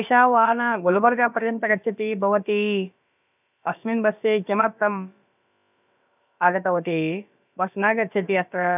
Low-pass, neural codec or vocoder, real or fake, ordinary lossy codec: 3.6 kHz; codec, 16 kHz, about 1 kbps, DyCAST, with the encoder's durations; fake; none